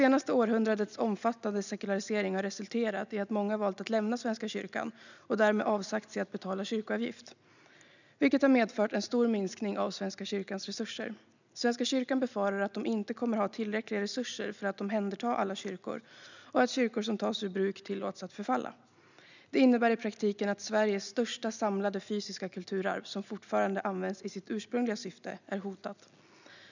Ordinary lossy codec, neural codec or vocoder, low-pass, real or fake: none; none; 7.2 kHz; real